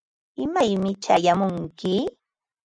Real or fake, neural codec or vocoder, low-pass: real; none; 9.9 kHz